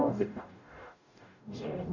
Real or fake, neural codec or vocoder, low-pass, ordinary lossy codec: fake; codec, 44.1 kHz, 0.9 kbps, DAC; 7.2 kHz; none